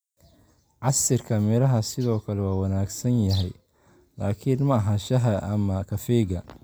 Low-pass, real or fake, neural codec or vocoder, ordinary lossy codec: none; real; none; none